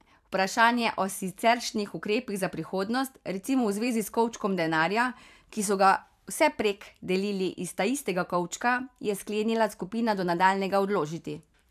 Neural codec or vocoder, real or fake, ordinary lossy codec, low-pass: vocoder, 44.1 kHz, 128 mel bands every 512 samples, BigVGAN v2; fake; AAC, 96 kbps; 14.4 kHz